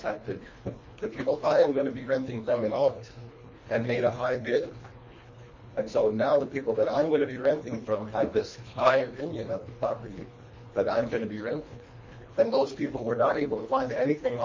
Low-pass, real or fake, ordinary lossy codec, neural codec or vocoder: 7.2 kHz; fake; MP3, 32 kbps; codec, 24 kHz, 1.5 kbps, HILCodec